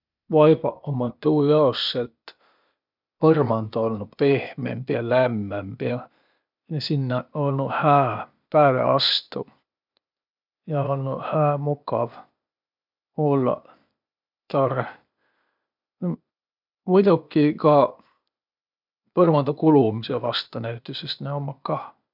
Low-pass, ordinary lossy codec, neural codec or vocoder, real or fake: 5.4 kHz; none; codec, 16 kHz, 0.8 kbps, ZipCodec; fake